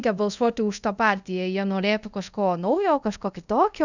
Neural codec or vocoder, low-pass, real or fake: codec, 24 kHz, 0.5 kbps, DualCodec; 7.2 kHz; fake